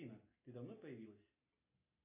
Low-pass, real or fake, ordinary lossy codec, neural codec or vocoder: 3.6 kHz; real; MP3, 24 kbps; none